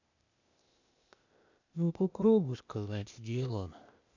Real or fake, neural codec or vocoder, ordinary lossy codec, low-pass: fake; codec, 16 kHz, 0.8 kbps, ZipCodec; none; 7.2 kHz